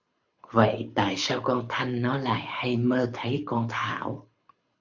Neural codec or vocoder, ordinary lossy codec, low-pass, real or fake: codec, 24 kHz, 6 kbps, HILCodec; MP3, 64 kbps; 7.2 kHz; fake